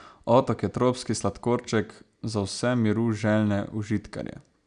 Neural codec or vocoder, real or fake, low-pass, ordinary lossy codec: none; real; 9.9 kHz; none